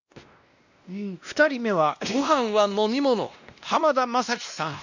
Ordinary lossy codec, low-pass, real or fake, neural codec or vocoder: none; 7.2 kHz; fake; codec, 16 kHz, 1 kbps, X-Codec, WavLM features, trained on Multilingual LibriSpeech